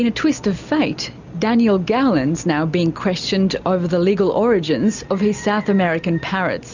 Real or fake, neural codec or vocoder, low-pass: real; none; 7.2 kHz